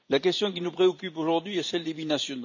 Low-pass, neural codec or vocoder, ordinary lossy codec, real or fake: 7.2 kHz; vocoder, 44.1 kHz, 128 mel bands every 512 samples, BigVGAN v2; none; fake